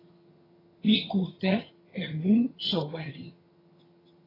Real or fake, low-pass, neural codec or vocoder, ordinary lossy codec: fake; 5.4 kHz; vocoder, 22.05 kHz, 80 mel bands, HiFi-GAN; AAC, 24 kbps